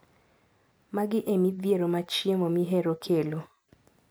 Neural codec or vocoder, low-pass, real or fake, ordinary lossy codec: none; none; real; none